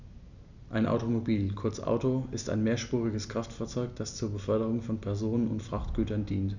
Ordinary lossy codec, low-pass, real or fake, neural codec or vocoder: none; 7.2 kHz; real; none